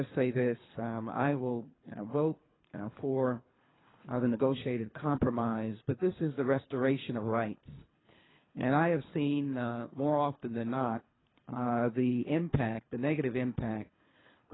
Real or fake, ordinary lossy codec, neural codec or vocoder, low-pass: fake; AAC, 16 kbps; codec, 24 kHz, 3 kbps, HILCodec; 7.2 kHz